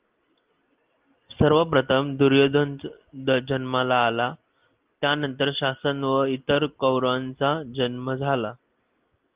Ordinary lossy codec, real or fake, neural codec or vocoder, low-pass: Opus, 24 kbps; real; none; 3.6 kHz